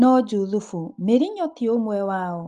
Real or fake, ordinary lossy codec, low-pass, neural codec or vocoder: real; Opus, 24 kbps; 10.8 kHz; none